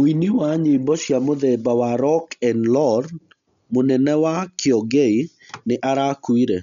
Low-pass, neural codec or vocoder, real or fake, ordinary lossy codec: 7.2 kHz; none; real; none